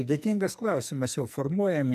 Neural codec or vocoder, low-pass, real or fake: codec, 44.1 kHz, 2.6 kbps, SNAC; 14.4 kHz; fake